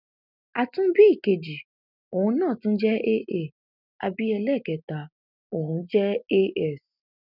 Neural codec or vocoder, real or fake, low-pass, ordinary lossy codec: none; real; 5.4 kHz; none